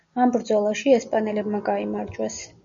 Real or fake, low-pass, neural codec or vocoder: real; 7.2 kHz; none